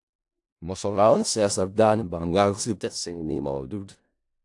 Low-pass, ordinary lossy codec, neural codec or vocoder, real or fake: 10.8 kHz; AAC, 64 kbps; codec, 16 kHz in and 24 kHz out, 0.4 kbps, LongCat-Audio-Codec, four codebook decoder; fake